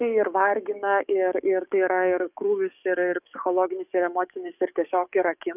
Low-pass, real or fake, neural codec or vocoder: 3.6 kHz; fake; codec, 44.1 kHz, 7.8 kbps, DAC